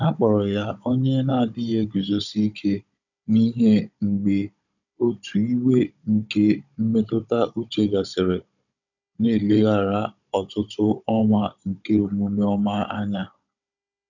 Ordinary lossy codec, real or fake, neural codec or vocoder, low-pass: none; fake; codec, 16 kHz, 16 kbps, FunCodec, trained on Chinese and English, 50 frames a second; 7.2 kHz